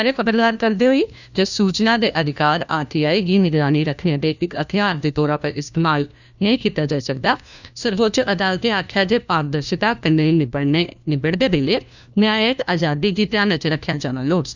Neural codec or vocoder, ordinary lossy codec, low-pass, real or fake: codec, 16 kHz, 1 kbps, FunCodec, trained on LibriTTS, 50 frames a second; none; 7.2 kHz; fake